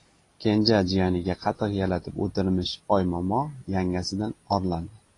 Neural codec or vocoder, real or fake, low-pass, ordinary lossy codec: none; real; 10.8 kHz; AAC, 32 kbps